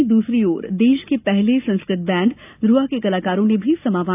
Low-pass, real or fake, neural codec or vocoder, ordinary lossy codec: 3.6 kHz; real; none; AAC, 32 kbps